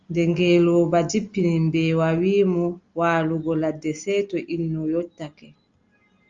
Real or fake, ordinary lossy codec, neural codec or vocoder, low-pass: real; Opus, 32 kbps; none; 7.2 kHz